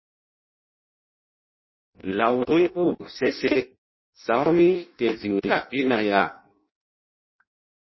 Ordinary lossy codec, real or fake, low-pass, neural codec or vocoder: MP3, 24 kbps; fake; 7.2 kHz; codec, 16 kHz in and 24 kHz out, 0.6 kbps, FireRedTTS-2 codec